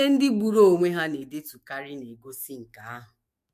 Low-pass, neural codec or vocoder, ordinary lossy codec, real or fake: 14.4 kHz; autoencoder, 48 kHz, 128 numbers a frame, DAC-VAE, trained on Japanese speech; MP3, 64 kbps; fake